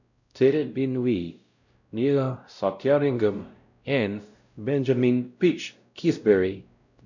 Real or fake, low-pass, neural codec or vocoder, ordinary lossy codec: fake; 7.2 kHz; codec, 16 kHz, 0.5 kbps, X-Codec, WavLM features, trained on Multilingual LibriSpeech; none